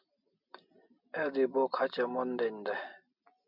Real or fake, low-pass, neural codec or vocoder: real; 5.4 kHz; none